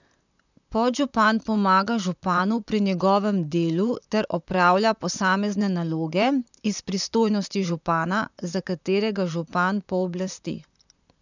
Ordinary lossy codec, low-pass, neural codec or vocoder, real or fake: none; 7.2 kHz; vocoder, 44.1 kHz, 128 mel bands, Pupu-Vocoder; fake